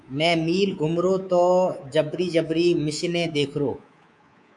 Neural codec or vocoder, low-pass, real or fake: codec, 24 kHz, 3.1 kbps, DualCodec; 10.8 kHz; fake